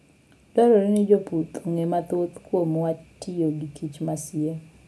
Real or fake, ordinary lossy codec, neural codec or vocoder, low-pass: real; none; none; none